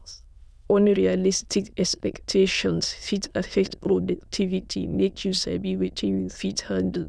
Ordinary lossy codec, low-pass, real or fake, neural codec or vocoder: none; none; fake; autoencoder, 22.05 kHz, a latent of 192 numbers a frame, VITS, trained on many speakers